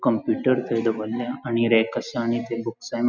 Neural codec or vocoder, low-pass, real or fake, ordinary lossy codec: none; 7.2 kHz; real; none